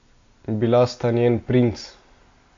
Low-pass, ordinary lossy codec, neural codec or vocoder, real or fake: 7.2 kHz; AAC, 48 kbps; none; real